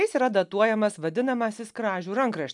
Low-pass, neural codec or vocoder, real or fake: 10.8 kHz; none; real